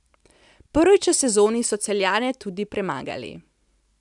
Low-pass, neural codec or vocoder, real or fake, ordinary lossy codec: 10.8 kHz; none; real; none